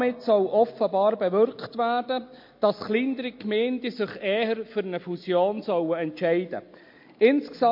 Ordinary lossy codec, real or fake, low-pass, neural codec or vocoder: MP3, 32 kbps; real; 5.4 kHz; none